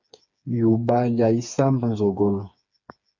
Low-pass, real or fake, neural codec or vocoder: 7.2 kHz; fake; codec, 16 kHz, 4 kbps, FreqCodec, smaller model